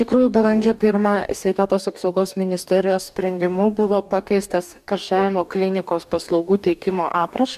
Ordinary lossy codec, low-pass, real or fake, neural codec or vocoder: MP3, 96 kbps; 14.4 kHz; fake; codec, 44.1 kHz, 2.6 kbps, DAC